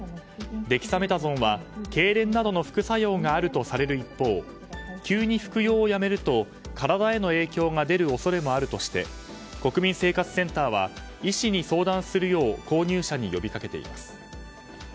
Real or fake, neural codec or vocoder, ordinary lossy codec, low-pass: real; none; none; none